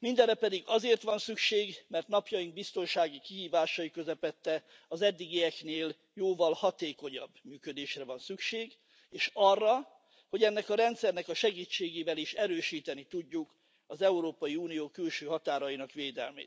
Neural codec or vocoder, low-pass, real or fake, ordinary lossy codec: none; none; real; none